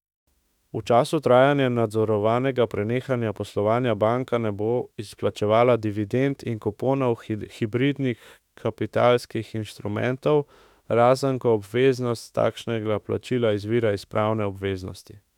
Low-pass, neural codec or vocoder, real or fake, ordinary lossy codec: 19.8 kHz; autoencoder, 48 kHz, 32 numbers a frame, DAC-VAE, trained on Japanese speech; fake; none